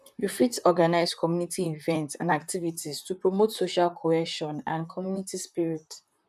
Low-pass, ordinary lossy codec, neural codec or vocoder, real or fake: 14.4 kHz; none; vocoder, 44.1 kHz, 128 mel bands, Pupu-Vocoder; fake